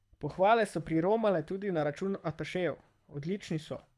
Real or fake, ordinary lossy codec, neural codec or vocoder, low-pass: fake; none; codec, 24 kHz, 6 kbps, HILCodec; none